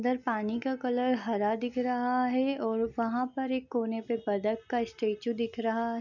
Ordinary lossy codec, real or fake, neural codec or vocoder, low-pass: none; real; none; 7.2 kHz